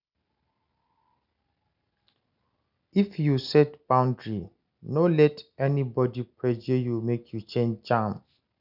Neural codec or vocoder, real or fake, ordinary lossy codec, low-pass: none; real; none; 5.4 kHz